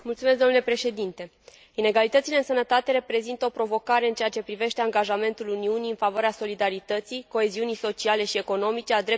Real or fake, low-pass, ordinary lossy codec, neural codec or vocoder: real; none; none; none